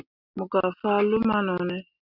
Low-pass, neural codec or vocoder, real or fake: 5.4 kHz; none; real